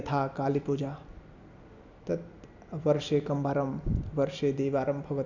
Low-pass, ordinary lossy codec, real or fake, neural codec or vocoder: 7.2 kHz; none; real; none